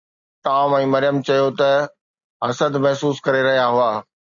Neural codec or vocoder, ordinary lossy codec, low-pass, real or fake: none; AAC, 64 kbps; 7.2 kHz; real